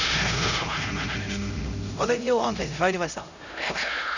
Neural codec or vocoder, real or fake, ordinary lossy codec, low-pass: codec, 16 kHz, 0.5 kbps, X-Codec, HuBERT features, trained on LibriSpeech; fake; none; 7.2 kHz